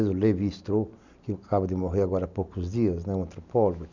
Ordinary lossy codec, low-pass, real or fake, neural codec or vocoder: none; 7.2 kHz; real; none